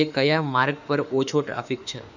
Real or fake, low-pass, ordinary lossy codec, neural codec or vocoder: fake; 7.2 kHz; none; autoencoder, 48 kHz, 32 numbers a frame, DAC-VAE, trained on Japanese speech